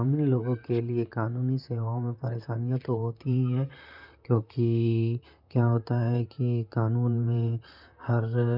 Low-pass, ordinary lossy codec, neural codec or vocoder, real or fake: 5.4 kHz; none; vocoder, 44.1 kHz, 128 mel bands, Pupu-Vocoder; fake